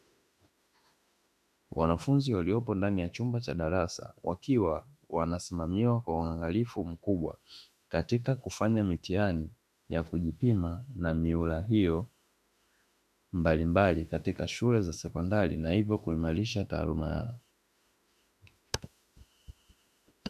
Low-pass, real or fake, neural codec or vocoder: 14.4 kHz; fake; autoencoder, 48 kHz, 32 numbers a frame, DAC-VAE, trained on Japanese speech